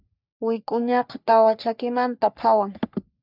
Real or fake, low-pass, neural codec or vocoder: fake; 5.4 kHz; codec, 44.1 kHz, 3.4 kbps, Pupu-Codec